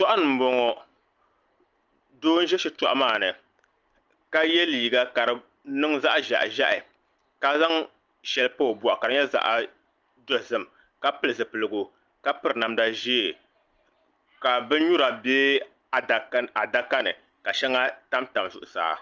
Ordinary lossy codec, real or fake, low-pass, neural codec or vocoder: Opus, 24 kbps; real; 7.2 kHz; none